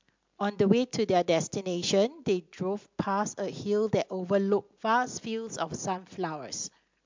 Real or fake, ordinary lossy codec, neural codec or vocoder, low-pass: real; MP3, 64 kbps; none; 7.2 kHz